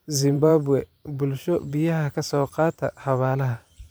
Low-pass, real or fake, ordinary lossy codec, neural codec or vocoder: none; fake; none; vocoder, 44.1 kHz, 128 mel bands every 512 samples, BigVGAN v2